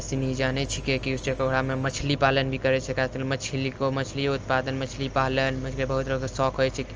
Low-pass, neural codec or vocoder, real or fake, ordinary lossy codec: 7.2 kHz; none; real; Opus, 24 kbps